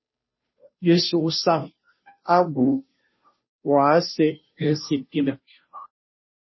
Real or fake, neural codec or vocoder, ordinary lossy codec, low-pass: fake; codec, 16 kHz, 0.5 kbps, FunCodec, trained on Chinese and English, 25 frames a second; MP3, 24 kbps; 7.2 kHz